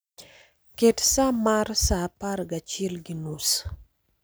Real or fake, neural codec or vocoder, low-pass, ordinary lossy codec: real; none; none; none